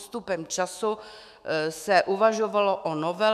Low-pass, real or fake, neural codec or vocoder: 14.4 kHz; fake; autoencoder, 48 kHz, 128 numbers a frame, DAC-VAE, trained on Japanese speech